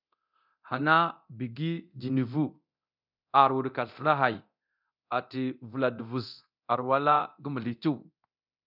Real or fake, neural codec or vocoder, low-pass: fake; codec, 24 kHz, 0.9 kbps, DualCodec; 5.4 kHz